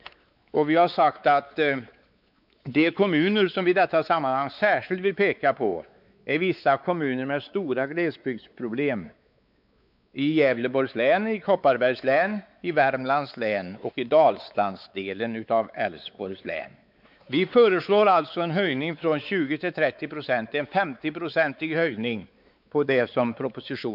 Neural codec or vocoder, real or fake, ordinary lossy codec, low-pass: codec, 16 kHz, 4 kbps, X-Codec, WavLM features, trained on Multilingual LibriSpeech; fake; none; 5.4 kHz